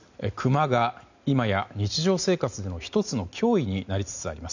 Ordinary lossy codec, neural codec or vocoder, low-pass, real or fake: none; none; 7.2 kHz; real